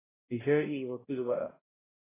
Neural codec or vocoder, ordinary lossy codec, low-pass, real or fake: codec, 16 kHz, 0.5 kbps, X-Codec, HuBERT features, trained on balanced general audio; AAC, 16 kbps; 3.6 kHz; fake